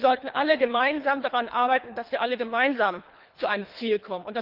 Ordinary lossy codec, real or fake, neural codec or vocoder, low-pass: Opus, 24 kbps; fake; codec, 24 kHz, 3 kbps, HILCodec; 5.4 kHz